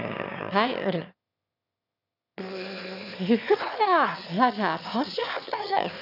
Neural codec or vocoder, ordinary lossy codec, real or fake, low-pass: autoencoder, 22.05 kHz, a latent of 192 numbers a frame, VITS, trained on one speaker; none; fake; 5.4 kHz